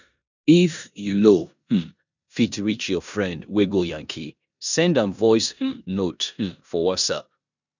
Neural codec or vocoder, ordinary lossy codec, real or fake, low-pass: codec, 16 kHz in and 24 kHz out, 0.9 kbps, LongCat-Audio-Codec, four codebook decoder; none; fake; 7.2 kHz